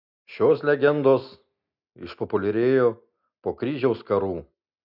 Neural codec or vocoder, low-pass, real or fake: none; 5.4 kHz; real